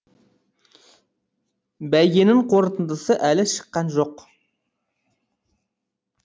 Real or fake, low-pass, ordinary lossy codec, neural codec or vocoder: real; none; none; none